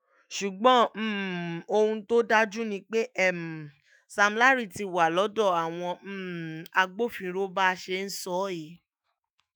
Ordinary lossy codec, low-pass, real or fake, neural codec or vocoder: none; none; fake; autoencoder, 48 kHz, 128 numbers a frame, DAC-VAE, trained on Japanese speech